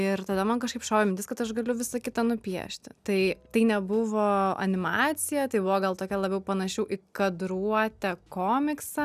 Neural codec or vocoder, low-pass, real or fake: none; 14.4 kHz; real